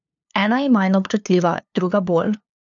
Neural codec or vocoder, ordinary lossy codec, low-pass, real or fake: codec, 16 kHz, 2 kbps, FunCodec, trained on LibriTTS, 25 frames a second; none; 7.2 kHz; fake